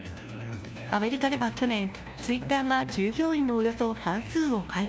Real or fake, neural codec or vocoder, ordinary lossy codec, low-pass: fake; codec, 16 kHz, 1 kbps, FunCodec, trained on LibriTTS, 50 frames a second; none; none